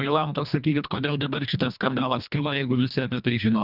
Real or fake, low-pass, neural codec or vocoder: fake; 5.4 kHz; codec, 24 kHz, 1.5 kbps, HILCodec